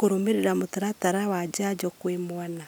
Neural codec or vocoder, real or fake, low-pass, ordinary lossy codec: none; real; none; none